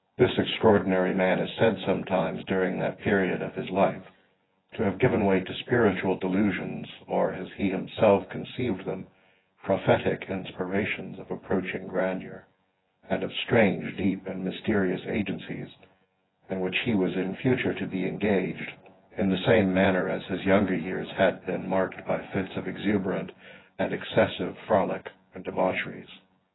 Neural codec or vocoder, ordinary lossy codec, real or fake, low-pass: vocoder, 24 kHz, 100 mel bands, Vocos; AAC, 16 kbps; fake; 7.2 kHz